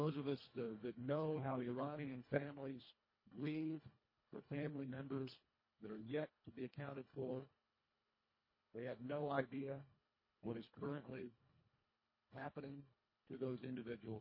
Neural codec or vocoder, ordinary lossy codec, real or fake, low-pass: codec, 24 kHz, 1.5 kbps, HILCodec; MP3, 24 kbps; fake; 5.4 kHz